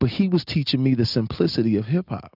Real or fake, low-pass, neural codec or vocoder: real; 5.4 kHz; none